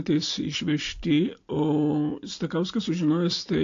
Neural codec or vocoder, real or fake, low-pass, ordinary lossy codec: none; real; 7.2 kHz; AAC, 48 kbps